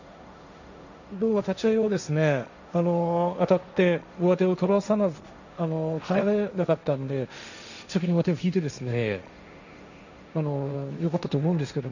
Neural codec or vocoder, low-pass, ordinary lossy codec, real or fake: codec, 16 kHz, 1.1 kbps, Voila-Tokenizer; 7.2 kHz; none; fake